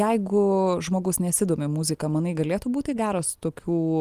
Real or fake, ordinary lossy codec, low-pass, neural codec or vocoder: real; Opus, 24 kbps; 14.4 kHz; none